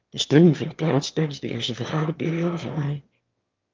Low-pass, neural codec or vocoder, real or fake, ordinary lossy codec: 7.2 kHz; autoencoder, 22.05 kHz, a latent of 192 numbers a frame, VITS, trained on one speaker; fake; Opus, 24 kbps